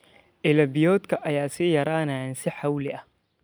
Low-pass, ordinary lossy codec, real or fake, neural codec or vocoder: none; none; real; none